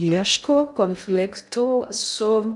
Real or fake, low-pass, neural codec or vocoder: fake; 10.8 kHz; codec, 16 kHz in and 24 kHz out, 0.6 kbps, FocalCodec, streaming, 2048 codes